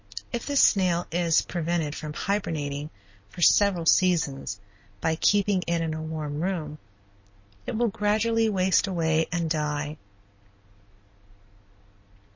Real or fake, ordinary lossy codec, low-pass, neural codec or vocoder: real; MP3, 32 kbps; 7.2 kHz; none